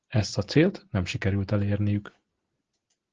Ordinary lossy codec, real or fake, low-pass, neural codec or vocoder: Opus, 16 kbps; real; 7.2 kHz; none